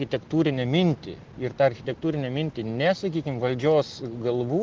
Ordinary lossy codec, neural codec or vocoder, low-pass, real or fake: Opus, 16 kbps; none; 7.2 kHz; real